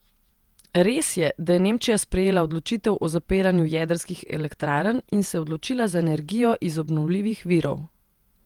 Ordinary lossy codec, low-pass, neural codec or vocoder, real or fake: Opus, 32 kbps; 19.8 kHz; vocoder, 48 kHz, 128 mel bands, Vocos; fake